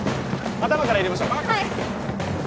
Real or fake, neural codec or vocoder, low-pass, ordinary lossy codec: real; none; none; none